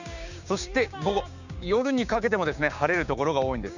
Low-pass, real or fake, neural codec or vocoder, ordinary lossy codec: 7.2 kHz; fake; codec, 16 kHz, 6 kbps, DAC; none